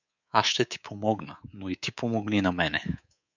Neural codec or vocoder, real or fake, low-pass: codec, 24 kHz, 3.1 kbps, DualCodec; fake; 7.2 kHz